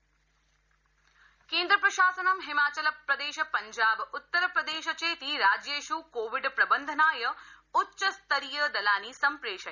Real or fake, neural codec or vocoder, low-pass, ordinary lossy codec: real; none; 7.2 kHz; none